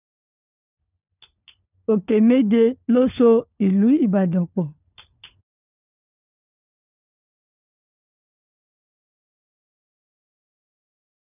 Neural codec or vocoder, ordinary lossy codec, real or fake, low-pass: codec, 16 kHz, 4 kbps, FunCodec, trained on LibriTTS, 50 frames a second; none; fake; 3.6 kHz